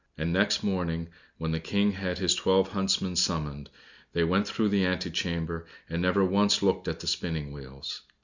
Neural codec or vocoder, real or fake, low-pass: none; real; 7.2 kHz